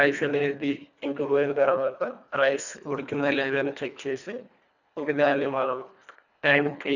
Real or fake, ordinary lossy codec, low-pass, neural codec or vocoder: fake; none; 7.2 kHz; codec, 24 kHz, 1.5 kbps, HILCodec